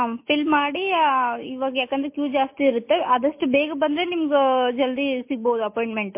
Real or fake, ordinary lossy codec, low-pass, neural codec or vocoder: real; MP3, 24 kbps; 3.6 kHz; none